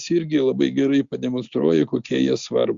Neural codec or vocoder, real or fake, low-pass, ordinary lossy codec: none; real; 7.2 kHz; Opus, 64 kbps